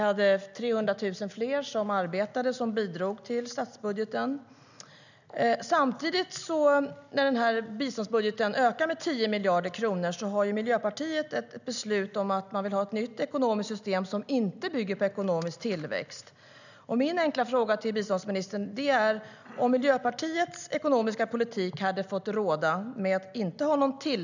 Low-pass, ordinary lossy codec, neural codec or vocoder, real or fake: 7.2 kHz; none; none; real